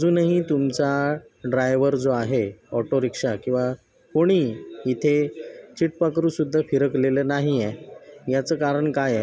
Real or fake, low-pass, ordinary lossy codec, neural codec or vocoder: real; none; none; none